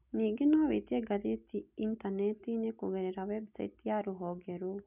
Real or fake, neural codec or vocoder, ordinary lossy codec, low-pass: real; none; none; 3.6 kHz